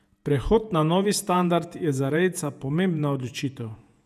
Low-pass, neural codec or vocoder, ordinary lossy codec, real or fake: 14.4 kHz; vocoder, 48 kHz, 128 mel bands, Vocos; none; fake